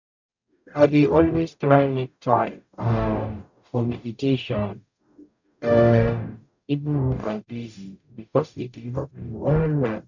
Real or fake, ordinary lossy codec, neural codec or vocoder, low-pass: fake; none; codec, 44.1 kHz, 0.9 kbps, DAC; 7.2 kHz